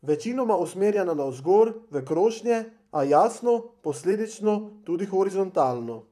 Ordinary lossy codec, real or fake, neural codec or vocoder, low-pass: none; fake; vocoder, 44.1 kHz, 128 mel bands, Pupu-Vocoder; 14.4 kHz